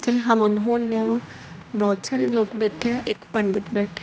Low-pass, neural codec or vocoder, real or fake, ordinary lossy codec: none; codec, 16 kHz, 1 kbps, X-Codec, HuBERT features, trained on general audio; fake; none